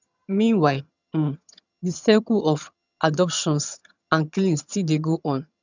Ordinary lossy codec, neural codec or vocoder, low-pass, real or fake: none; vocoder, 22.05 kHz, 80 mel bands, HiFi-GAN; 7.2 kHz; fake